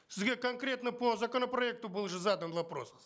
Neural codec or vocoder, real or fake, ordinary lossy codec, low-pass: none; real; none; none